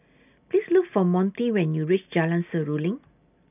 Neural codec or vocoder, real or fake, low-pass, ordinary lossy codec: none; real; 3.6 kHz; none